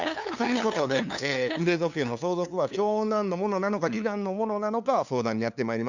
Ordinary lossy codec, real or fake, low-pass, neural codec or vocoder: none; fake; 7.2 kHz; codec, 16 kHz, 2 kbps, FunCodec, trained on LibriTTS, 25 frames a second